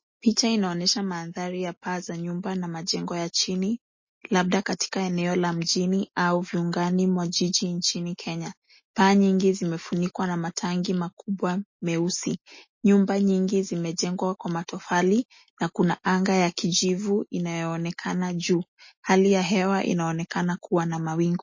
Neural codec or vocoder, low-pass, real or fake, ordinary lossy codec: none; 7.2 kHz; real; MP3, 32 kbps